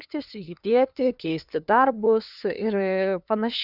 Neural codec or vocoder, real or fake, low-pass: codec, 16 kHz, 2 kbps, FunCodec, trained on LibriTTS, 25 frames a second; fake; 5.4 kHz